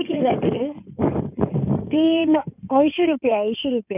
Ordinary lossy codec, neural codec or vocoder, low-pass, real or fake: none; codec, 16 kHz in and 24 kHz out, 2.2 kbps, FireRedTTS-2 codec; 3.6 kHz; fake